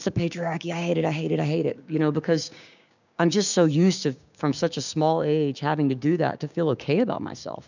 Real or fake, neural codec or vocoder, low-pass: fake; codec, 16 kHz, 6 kbps, DAC; 7.2 kHz